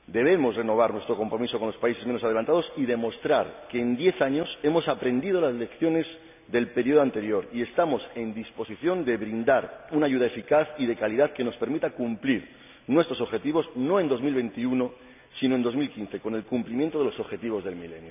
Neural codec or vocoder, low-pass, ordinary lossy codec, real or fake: none; 3.6 kHz; none; real